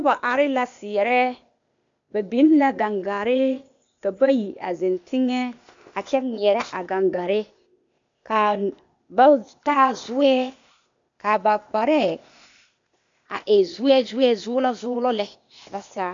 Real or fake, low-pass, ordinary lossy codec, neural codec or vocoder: fake; 7.2 kHz; MP3, 64 kbps; codec, 16 kHz, 0.8 kbps, ZipCodec